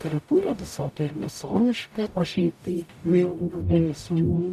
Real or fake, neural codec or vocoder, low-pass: fake; codec, 44.1 kHz, 0.9 kbps, DAC; 14.4 kHz